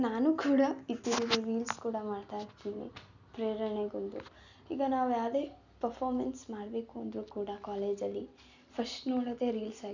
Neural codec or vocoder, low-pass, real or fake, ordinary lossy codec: none; 7.2 kHz; real; none